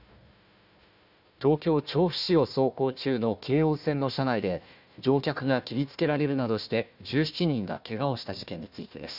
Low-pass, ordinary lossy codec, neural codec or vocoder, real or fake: 5.4 kHz; none; codec, 16 kHz, 1 kbps, FunCodec, trained on Chinese and English, 50 frames a second; fake